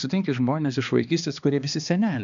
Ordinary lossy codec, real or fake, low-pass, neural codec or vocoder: AAC, 96 kbps; fake; 7.2 kHz; codec, 16 kHz, 2 kbps, X-Codec, HuBERT features, trained on balanced general audio